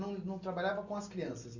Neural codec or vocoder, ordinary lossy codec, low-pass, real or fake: none; Opus, 64 kbps; 7.2 kHz; real